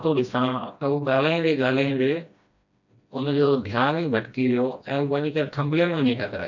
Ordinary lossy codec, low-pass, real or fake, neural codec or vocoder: none; 7.2 kHz; fake; codec, 16 kHz, 1 kbps, FreqCodec, smaller model